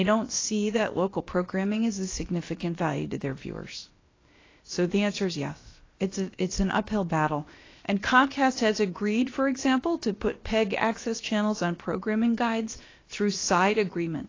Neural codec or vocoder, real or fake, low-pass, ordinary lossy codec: codec, 16 kHz, about 1 kbps, DyCAST, with the encoder's durations; fake; 7.2 kHz; AAC, 32 kbps